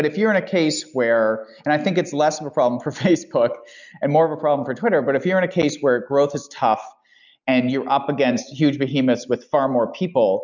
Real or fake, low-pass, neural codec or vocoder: real; 7.2 kHz; none